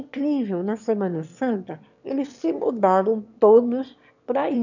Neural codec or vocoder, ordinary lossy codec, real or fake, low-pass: autoencoder, 22.05 kHz, a latent of 192 numbers a frame, VITS, trained on one speaker; none; fake; 7.2 kHz